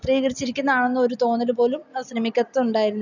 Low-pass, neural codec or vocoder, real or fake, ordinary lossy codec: 7.2 kHz; none; real; none